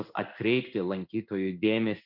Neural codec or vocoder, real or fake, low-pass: codec, 16 kHz in and 24 kHz out, 1 kbps, XY-Tokenizer; fake; 5.4 kHz